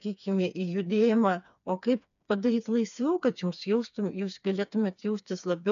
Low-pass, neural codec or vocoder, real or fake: 7.2 kHz; codec, 16 kHz, 4 kbps, FreqCodec, smaller model; fake